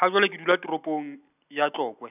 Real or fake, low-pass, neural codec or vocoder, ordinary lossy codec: real; 3.6 kHz; none; none